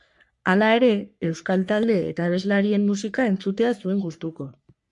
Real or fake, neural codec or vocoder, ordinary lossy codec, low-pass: fake; codec, 44.1 kHz, 3.4 kbps, Pupu-Codec; MP3, 64 kbps; 10.8 kHz